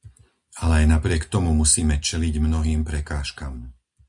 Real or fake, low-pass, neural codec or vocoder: real; 10.8 kHz; none